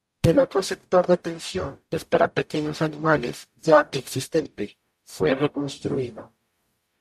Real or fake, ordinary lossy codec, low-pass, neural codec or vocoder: fake; MP3, 64 kbps; 14.4 kHz; codec, 44.1 kHz, 0.9 kbps, DAC